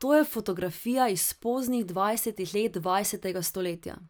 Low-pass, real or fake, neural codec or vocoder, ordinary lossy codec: none; fake; vocoder, 44.1 kHz, 128 mel bands every 256 samples, BigVGAN v2; none